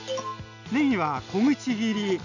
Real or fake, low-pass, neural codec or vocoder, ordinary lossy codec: real; 7.2 kHz; none; none